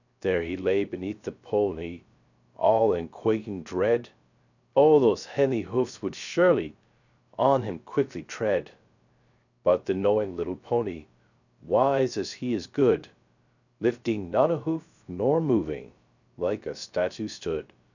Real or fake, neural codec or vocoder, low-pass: fake; codec, 16 kHz, 0.3 kbps, FocalCodec; 7.2 kHz